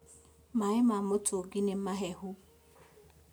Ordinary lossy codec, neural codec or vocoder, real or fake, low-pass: none; none; real; none